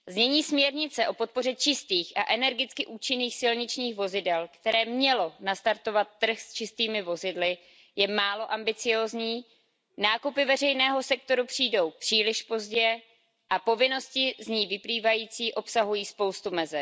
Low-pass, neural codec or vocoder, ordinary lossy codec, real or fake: none; none; none; real